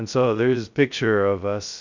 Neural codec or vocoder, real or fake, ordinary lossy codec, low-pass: codec, 16 kHz, 0.2 kbps, FocalCodec; fake; Opus, 64 kbps; 7.2 kHz